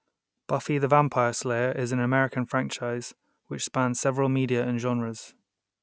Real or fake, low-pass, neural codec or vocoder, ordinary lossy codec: real; none; none; none